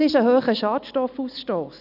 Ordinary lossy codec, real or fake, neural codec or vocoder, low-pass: none; real; none; 5.4 kHz